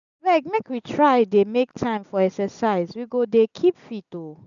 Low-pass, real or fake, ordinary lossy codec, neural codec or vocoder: 7.2 kHz; real; none; none